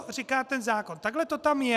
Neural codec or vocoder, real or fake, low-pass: none; real; 14.4 kHz